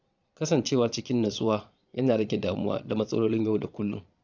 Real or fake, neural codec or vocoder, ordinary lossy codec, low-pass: fake; vocoder, 22.05 kHz, 80 mel bands, Vocos; none; 7.2 kHz